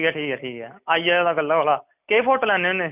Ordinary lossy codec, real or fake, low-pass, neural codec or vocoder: none; real; 3.6 kHz; none